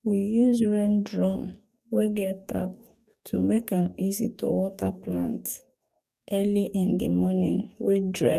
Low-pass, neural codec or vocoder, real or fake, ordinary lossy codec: 14.4 kHz; codec, 44.1 kHz, 2.6 kbps, DAC; fake; none